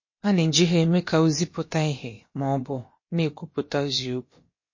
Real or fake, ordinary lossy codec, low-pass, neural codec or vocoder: fake; MP3, 32 kbps; 7.2 kHz; codec, 16 kHz, about 1 kbps, DyCAST, with the encoder's durations